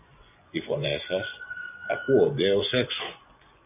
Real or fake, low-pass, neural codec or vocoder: fake; 3.6 kHz; vocoder, 24 kHz, 100 mel bands, Vocos